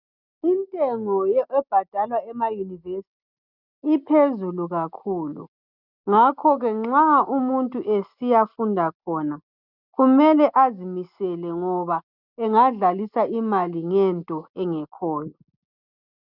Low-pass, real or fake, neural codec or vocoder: 5.4 kHz; real; none